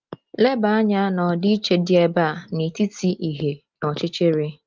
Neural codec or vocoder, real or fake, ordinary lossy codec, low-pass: none; real; Opus, 24 kbps; 7.2 kHz